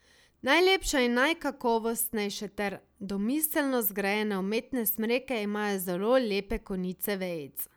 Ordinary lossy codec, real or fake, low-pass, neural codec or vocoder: none; real; none; none